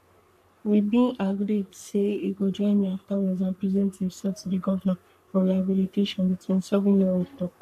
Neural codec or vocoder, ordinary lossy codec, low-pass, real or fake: codec, 44.1 kHz, 3.4 kbps, Pupu-Codec; none; 14.4 kHz; fake